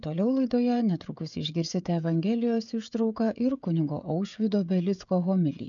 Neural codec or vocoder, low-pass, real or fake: codec, 16 kHz, 16 kbps, FreqCodec, smaller model; 7.2 kHz; fake